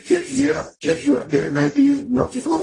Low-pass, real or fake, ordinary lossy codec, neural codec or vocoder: 10.8 kHz; fake; AAC, 32 kbps; codec, 44.1 kHz, 0.9 kbps, DAC